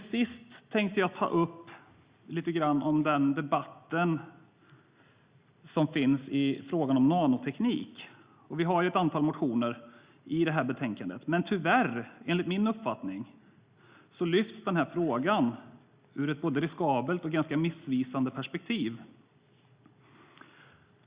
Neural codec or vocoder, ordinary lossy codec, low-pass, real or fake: none; Opus, 64 kbps; 3.6 kHz; real